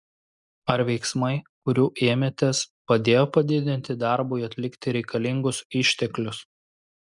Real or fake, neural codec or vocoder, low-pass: real; none; 10.8 kHz